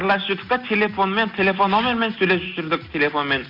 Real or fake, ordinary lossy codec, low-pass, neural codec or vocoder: real; none; 5.4 kHz; none